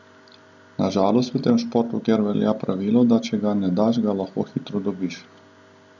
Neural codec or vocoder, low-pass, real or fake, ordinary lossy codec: none; 7.2 kHz; real; none